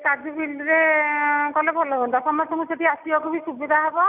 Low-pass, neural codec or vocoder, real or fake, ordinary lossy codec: 3.6 kHz; none; real; none